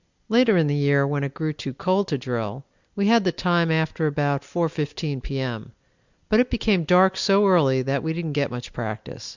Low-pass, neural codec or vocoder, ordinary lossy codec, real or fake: 7.2 kHz; none; Opus, 64 kbps; real